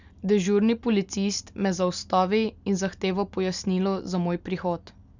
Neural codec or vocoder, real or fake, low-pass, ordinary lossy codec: none; real; 7.2 kHz; none